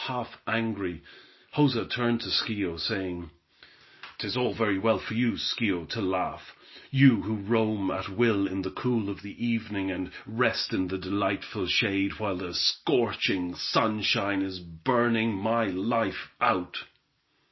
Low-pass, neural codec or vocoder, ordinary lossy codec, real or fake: 7.2 kHz; none; MP3, 24 kbps; real